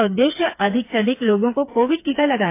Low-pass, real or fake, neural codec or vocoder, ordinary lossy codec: 3.6 kHz; fake; codec, 16 kHz, 4 kbps, FreqCodec, smaller model; AAC, 24 kbps